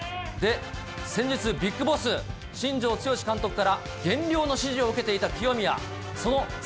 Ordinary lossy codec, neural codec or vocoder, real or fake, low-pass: none; none; real; none